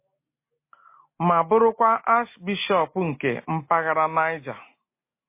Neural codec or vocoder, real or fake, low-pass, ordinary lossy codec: none; real; 3.6 kHz; MP3, 24 kbps